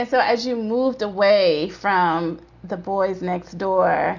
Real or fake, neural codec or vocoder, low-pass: real; none; 7.2 kHz